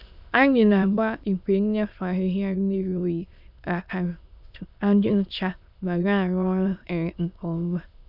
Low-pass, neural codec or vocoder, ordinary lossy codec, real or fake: 5.4 kHz; autoencoder, 22.05 kHz, a latent of 192 numbers a frame, VITS, trained on many speakers; none; fake